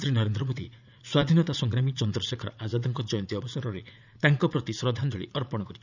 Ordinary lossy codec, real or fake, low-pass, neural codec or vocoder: none; fake; 7.2 kHz; vocoder, 44.1 kHz, 80 mel bands, Vocos